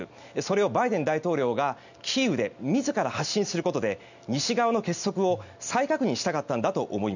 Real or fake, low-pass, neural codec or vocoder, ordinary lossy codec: real; 7.2 kHz; none; none